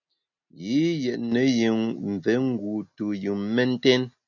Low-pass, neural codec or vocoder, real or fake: 7.2 kHz; none; real